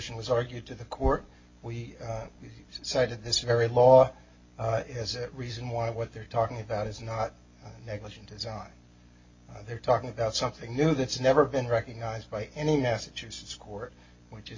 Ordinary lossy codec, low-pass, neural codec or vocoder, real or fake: MP3, 32 kbps; 7.2 kHz; none; real